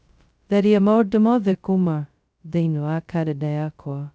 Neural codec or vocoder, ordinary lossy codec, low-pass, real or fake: codec, 16 kHz, 0.2 kbps, FocalCodec; none; none; fake